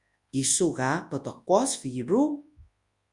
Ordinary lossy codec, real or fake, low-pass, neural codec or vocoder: Opus, 64 kbps; fake; 10.8 kHz; codec, 24 kHz, 0.9 kbps, WavTokenizer, large speech release